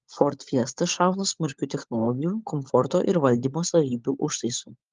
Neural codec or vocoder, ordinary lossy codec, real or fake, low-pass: codec, 16 kHz, 16 kbps, FunCodec, trained on LibriTTS, 50 frames a second; Opus, 24 kbps; fake; 7.2 kHz